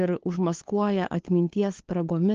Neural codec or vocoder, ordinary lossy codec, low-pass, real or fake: codec, 16 kHz, 2 kbps, FunCodec, trained on LibriTTS, 25 frames a second; Opus, 16 kbps; 7.2 kHz; fake